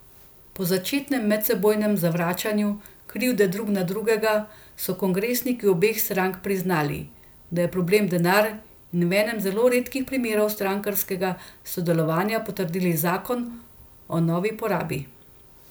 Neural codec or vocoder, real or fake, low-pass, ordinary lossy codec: none; real; none; none